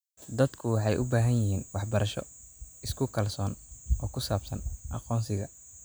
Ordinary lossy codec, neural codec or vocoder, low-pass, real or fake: none; none; none; real